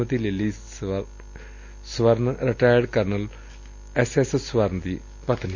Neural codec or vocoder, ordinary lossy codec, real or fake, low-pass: none; none; real; 7.2 kHz